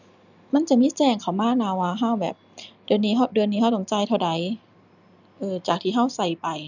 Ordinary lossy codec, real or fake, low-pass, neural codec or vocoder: none; real; 7.2 kHz; none